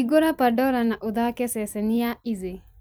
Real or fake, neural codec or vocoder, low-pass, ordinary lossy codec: fake; vocoder, 44.1 kHz, 128 mel bands every 256 samples, BigVGAN v2; none; none